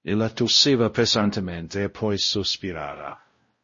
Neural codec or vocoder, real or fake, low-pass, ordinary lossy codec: codec, 16 kHz, 0.5 kbps, X-Codec, WavLM features, trained on Multilingual LibriSpeech; fake; 7.2 kHz; MP3, 32 kbps